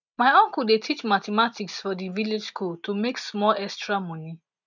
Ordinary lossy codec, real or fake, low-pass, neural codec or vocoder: none; real; 7.2 kHz; none